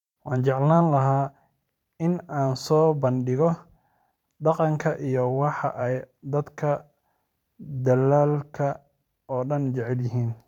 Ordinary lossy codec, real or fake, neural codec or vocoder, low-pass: none; fake; vocoder, 44.1 kHz, 128 mel bands every 512 samples, BigVGAN v2; 19.8 kHz